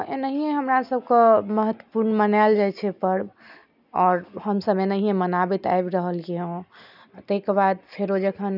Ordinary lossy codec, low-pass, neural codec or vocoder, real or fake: none; 5.4 kHz; none; real